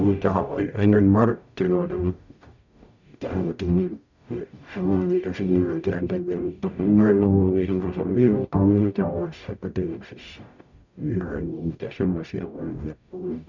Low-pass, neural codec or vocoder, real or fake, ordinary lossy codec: 7.2 kHz; codec, 44.1 kHz, 0.9 kbps, DAC; fake; none